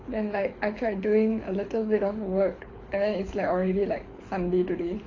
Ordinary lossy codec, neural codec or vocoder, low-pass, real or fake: AAC, 32 kbps; codec, 24 kHz, 6 kbps, HILCodec; 7.2 kHz; fake